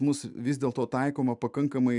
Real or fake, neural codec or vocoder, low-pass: real; none; 10.8 kHz